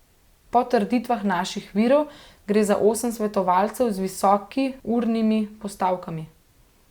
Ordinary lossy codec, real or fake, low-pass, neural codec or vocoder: Opus, 64 kbps; real; 19.8 kHz; none